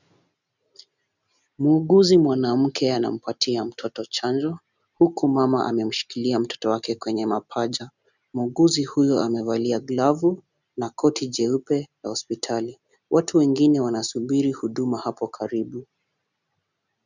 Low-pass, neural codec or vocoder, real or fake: 7.2 kHz; none; real